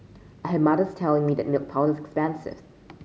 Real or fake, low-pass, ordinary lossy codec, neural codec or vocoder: real; none; none; none